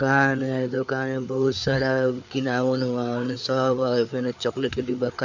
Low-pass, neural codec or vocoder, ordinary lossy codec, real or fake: 7.2 kHz; codec, 16 kHz, 4 kbps, FreqCodec, larger model; none; fake